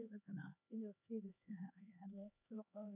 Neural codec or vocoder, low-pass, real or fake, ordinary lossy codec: codec, 16 kHz, 2 kbps, X-Codec, HuBERT features, trained on LibriSpeech; 3.6 kHz; fake; none